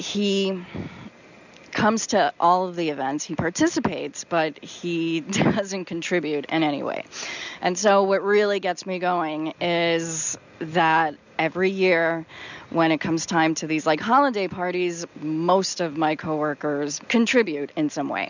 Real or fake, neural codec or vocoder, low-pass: real; none; 7.2 kHz